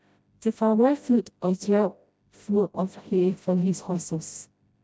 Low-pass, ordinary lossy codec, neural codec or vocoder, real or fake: none; none; codec, 16 kHz, 0.5 kbps, FreqCodec, smaller model; fake